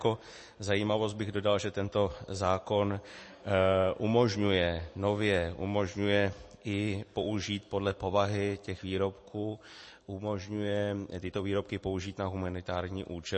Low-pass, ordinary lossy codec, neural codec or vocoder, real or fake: 10.8 kHz; MP3, 32 kbps; none; real